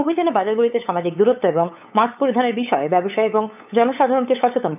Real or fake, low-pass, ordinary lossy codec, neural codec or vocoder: fake; 3.6 kHz; none; codec, 16 kHz, 8 kbps, FunCodec, trained on LibriTTS, 25 frames a second